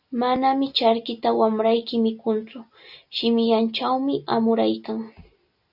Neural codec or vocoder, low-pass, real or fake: none; 5.4 kHz; real